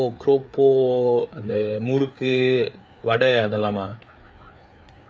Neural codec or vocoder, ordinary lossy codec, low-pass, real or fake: codec, 16 kHz, 4 kbps, FreqCodec, larger model; none; none; fake